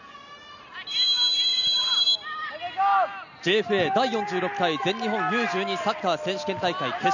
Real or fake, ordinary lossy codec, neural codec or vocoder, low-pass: real; none; none; 7.2 kHz